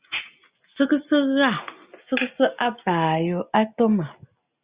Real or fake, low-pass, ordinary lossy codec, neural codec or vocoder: real; 3.6 kHz; Opus, 64 kbps; none